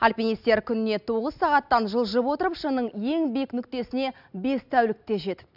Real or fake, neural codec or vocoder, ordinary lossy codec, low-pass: real; none; none; 5.4 kHz